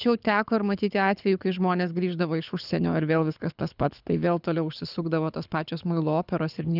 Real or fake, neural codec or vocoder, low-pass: fake; codec, 24 kHz, 6 kbps, HILCodec; 5.4 kHz